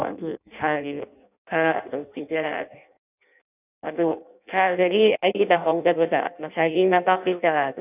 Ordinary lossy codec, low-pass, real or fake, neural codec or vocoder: none; 3.6 kHz; fake; codec, 16 kHz in and 24 kHz out, 0.6 kbps, FireRedTTS-2 codec